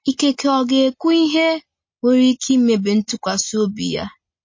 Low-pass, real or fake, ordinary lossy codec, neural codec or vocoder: 7.2 kHz; real; MP3, 32 kbps; none